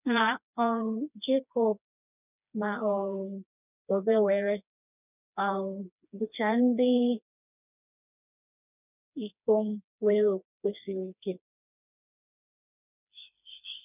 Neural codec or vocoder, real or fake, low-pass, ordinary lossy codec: codec, 16 kHz, 2 kbps, FreqCodec, smaller model; fake; 3.6 kHz; none